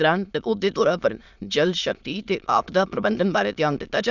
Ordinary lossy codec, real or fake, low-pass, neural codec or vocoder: none; fake; 7.2 kHz; autoencoder, 22.05 kHz, a latent of 192 numbers a frame, VITS, trained on many speakers